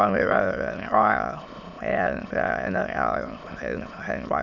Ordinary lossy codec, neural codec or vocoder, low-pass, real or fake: MP3, 64 kbps; autoencoder, 22.05 kHz, a latent of 192 numbers a frame, VITS, trained on many speakers; 7.2 kHz; fake